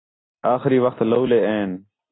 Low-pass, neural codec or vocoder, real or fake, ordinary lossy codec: 7.2 kHz; none; real; AAC, 16 kbps